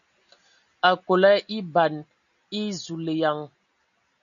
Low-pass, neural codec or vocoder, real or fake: 7.2 kHz; none; real